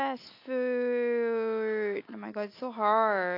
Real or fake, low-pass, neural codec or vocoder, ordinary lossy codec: real; 5.4 kHz; none; AAC, 32 kbps